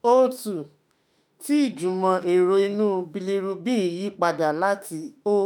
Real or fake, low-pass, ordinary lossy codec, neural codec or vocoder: fake; none; none; autoencoder, 48 kHz, 32 numbers a frame, DAC-VAE, trained on Japanese speech